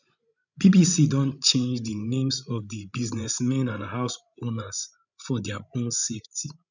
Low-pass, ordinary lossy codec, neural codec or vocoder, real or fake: 7.2 kHz; none; codec, 16 kHz, 16 kbps, FreqCodec, larger model; fake